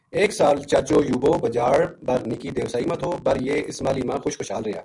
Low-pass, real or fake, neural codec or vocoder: 10.8 kHz; real; none